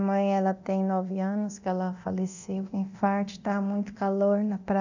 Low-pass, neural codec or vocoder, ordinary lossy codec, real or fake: 7.2 kHz; codec, 24 kHz, 0.9 kbps, DualCodec; none; fake